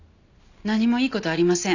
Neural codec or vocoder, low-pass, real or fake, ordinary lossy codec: none; 7.2 kHz; real; none